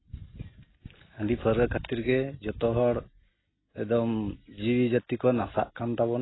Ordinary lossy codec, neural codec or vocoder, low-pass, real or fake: AAC, 16 kbps; none; 7.2 kHz; real